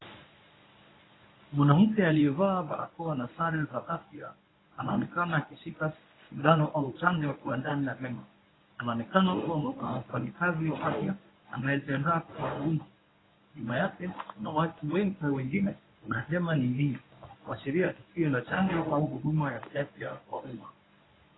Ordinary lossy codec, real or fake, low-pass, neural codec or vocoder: AAC, 16 kbps; fake; 7.2 kHz; codec, 24 kHz, 0.9 kbps, WavTokenizer, medium speech release version 1